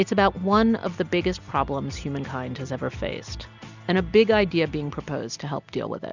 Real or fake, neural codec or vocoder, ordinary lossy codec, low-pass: real; none; Opus, 64 kbps; 7.2 kHz